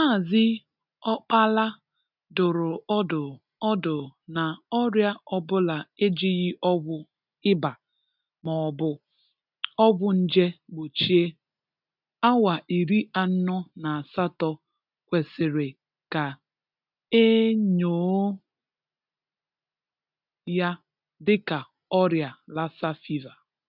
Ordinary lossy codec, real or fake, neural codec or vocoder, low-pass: none; real; none; 5.4 kHz